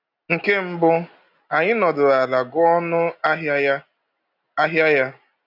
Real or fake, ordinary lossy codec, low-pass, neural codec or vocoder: real; none; 5.4 kHz; none